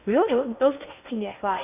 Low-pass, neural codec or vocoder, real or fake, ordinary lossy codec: 3.6 kHz; codec, 16 kHz in and 24 kHz out, 0.6 kbps, FocalCodec, streaming, 2048 codes; fake; none